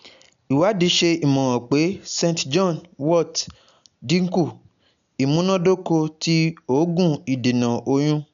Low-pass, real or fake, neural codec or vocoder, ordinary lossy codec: 7.2 kHz; real; none; none